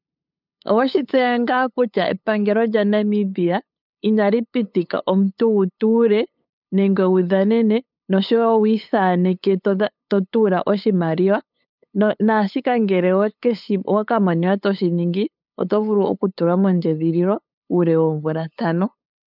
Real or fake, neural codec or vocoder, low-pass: fake; codec, 16 kHz, 8 kbps, FunCodec, trained on LibriTTS, 25 frames a second; 5.4 kHz